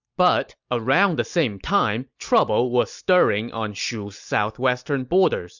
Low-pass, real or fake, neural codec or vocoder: 7.2 kHz; real; none